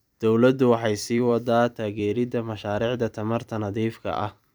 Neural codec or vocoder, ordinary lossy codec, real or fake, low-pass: vocoder, 44.1 kHz, 128 mel bands every 256 samples, BigVGAN v2; none; fake; none